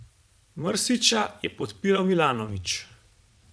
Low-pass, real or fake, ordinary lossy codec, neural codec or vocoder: none; fake; none; vocoder, 22.05 kHz, 80 mel bands, Vocos